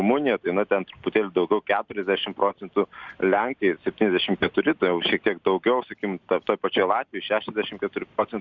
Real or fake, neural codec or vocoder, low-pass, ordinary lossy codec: real; none; 7.2 kHz; Opus, 64 kbps